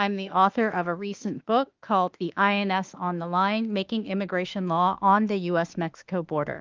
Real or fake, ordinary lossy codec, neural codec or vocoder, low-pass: fake; Opus, 24 kbps; autoencoder, 48 kHz, 32 numbers a frame, DAC-VAE, trained on Japanese speech; 7.2 kHz